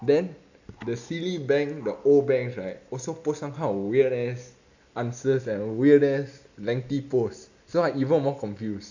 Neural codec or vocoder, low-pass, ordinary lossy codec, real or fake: none; 7.2 kHz; none; real